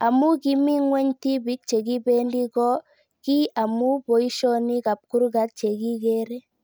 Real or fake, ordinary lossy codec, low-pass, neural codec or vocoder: real; none; none; none